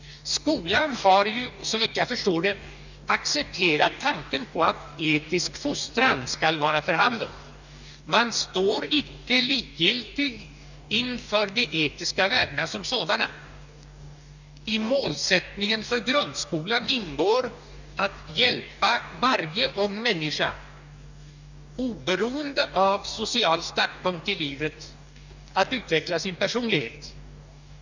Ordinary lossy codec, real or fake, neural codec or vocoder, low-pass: none; fake; codec, 44.1 kHz, 2.6 kbps, DAC; 7.2 kHz